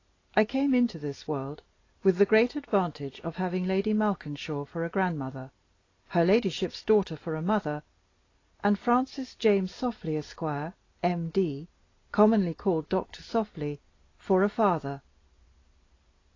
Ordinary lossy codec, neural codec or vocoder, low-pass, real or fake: AAC, 32 kbps; none; 7.2 kHz; real